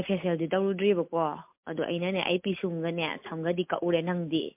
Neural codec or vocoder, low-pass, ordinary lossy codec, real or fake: none; 3.6 kHz; MP3, 32 kbps; real